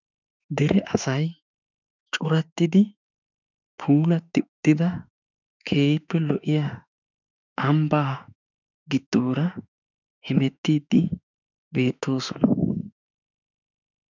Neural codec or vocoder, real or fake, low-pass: autoencoder, 48 kHz, 32 numbers a frame, DAC-VAE, trained on Japanese speech; fake; 7.2 kHz